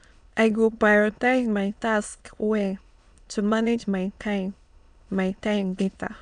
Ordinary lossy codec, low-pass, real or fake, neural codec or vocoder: none; 9.9 kHz; fake; autoencoder, 22.05 kHz, a latent of 192 numbers a frame, VITS, trained on many speakers